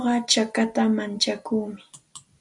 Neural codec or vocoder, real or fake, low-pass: none; real; 10.8 kHz